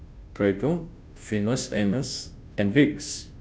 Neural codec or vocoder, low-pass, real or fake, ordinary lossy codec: codec, 16 kHz, 0.5 kbps, FunCodec, trained on Chinese and English, 25 frames a second; none; fake; none